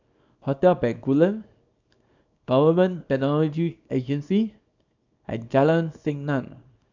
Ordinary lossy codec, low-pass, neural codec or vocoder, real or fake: none; 7.2 kHz; codec, 24 kHz, 0.9 kbps, WavTokenizer, small release; fake